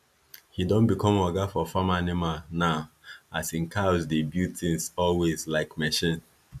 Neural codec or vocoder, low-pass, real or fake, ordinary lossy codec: none; 14.4 kHz; real; none